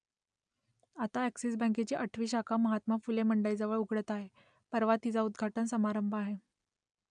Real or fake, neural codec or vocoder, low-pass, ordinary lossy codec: real; none; 9.9 kHz; none